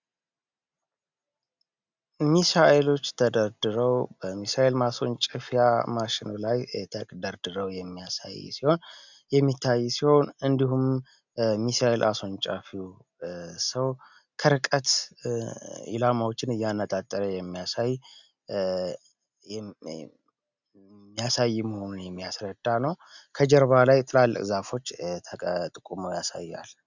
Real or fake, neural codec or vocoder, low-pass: real; none; 7.2 kHz